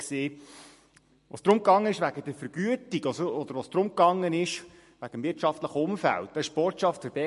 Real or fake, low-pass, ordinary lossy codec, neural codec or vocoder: real; 14.4 kHz; MP3, 48 kbps; none